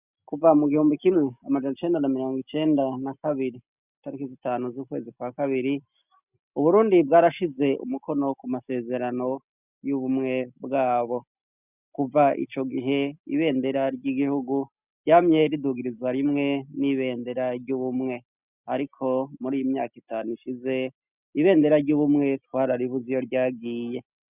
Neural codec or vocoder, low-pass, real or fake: none; 3.6 kHz; real